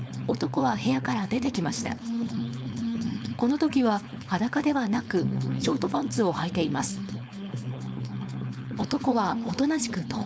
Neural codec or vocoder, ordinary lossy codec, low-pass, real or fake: codec, 16 kHz, 4.8 kbps, FACodec; none; none; fake